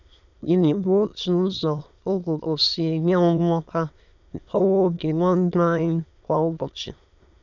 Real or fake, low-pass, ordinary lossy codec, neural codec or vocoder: fake; 7.2 kHz; none; autoencoder, 22.05 kHz, a latent of 192 numbers a frame, VITS, trained on many speakers